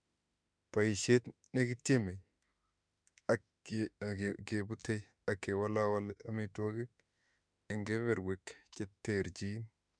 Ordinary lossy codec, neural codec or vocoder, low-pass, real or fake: MP3, 96 kbps; autoencoder, 48 kHz, 32 numbers a frame, DAC-VAE, trained on Japanese speech; 9.9 kHz; fake